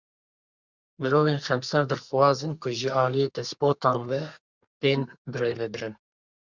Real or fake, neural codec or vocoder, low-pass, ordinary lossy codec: fake; codec, 32 kHz, 1.9 kbps, SNAC; 7.2 kHz; Opus, 64 kbps